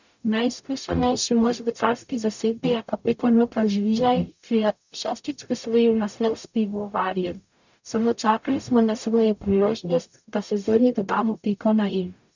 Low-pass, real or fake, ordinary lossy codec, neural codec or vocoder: 7.2 kHz; fake; none; codec, 44.1 kHz, 0.9 kbps, DAC